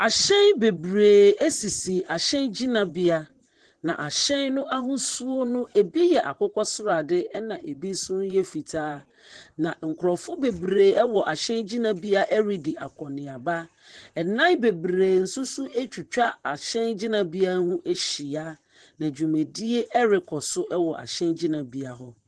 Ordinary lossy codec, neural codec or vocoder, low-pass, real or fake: Opus, 16 kbps; vocoder, 44.1 kHz, 128 mel bands, Pupu-Vocoder; 10.8 kHz; fake